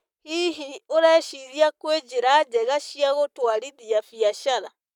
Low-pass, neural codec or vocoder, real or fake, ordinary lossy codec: 19.8 kHz; codec, 44.1 kHz, 7.8 kbps, Pupu-Codec; fake; none